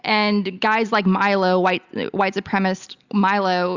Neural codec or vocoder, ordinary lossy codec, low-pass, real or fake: none; Opus, 64 kbps; 7.2 kHz; real